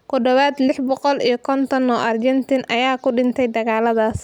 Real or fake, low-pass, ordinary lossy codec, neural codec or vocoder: real; 19.8 kHz; none; none